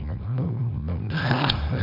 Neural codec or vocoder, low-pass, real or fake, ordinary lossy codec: codec, 24 kHz, 0.9 kbps, WavTokenizer, small release; 5.4 kHz; fake; none